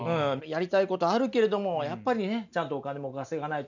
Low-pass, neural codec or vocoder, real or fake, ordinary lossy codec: 7.2 kHz; none; real; none